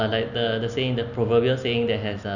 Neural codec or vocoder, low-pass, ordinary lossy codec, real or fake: none; 7.2 kHz; none; real